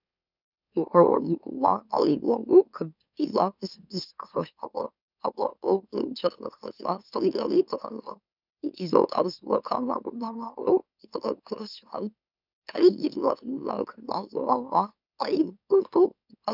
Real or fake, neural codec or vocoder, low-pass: fake; autoencoder, 44.1 kHz, a latent of 192 numbers a frame, MeloTTS; 5.4 kHz